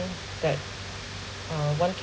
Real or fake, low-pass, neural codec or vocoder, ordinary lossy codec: real; none; none; none